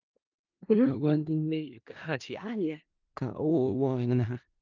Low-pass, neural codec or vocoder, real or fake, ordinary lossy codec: 7.2 kHz; codec, 16 kHz in and 24 kHz out, 0.4 kbps, LongCat-Audio-Codec, four codebook decoder; fake; Opus, 24 kbps